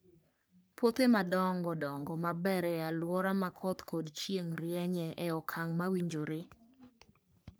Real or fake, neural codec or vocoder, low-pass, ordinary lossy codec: fake; codec, 44.1 kHz, 3.4 kbps, Pupu-Codec; none; none